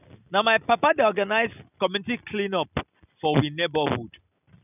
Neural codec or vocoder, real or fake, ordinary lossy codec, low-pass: none; real; none; 3.6 kHz